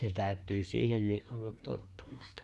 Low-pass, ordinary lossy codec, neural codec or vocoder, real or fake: none; none; codec, 24 kHz, 1 kbps, SNAC; fake